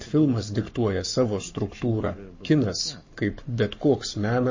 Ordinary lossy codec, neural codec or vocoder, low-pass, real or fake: MP3, 32 kbps; codec, 44.1 kHz, 7.8 kbps, Pupu-Codec; 7.2 kHz; fake